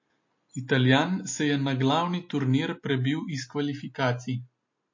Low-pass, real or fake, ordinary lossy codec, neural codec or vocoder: 7.2 kHz; real; MP3, 32 kbps; none